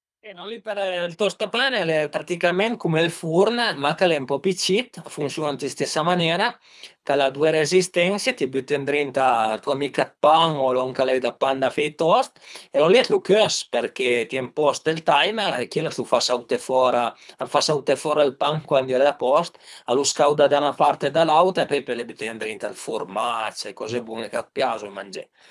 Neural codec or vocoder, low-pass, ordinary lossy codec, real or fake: codec, 24 kHz, 3 kbps, HILCodec; none; none; fake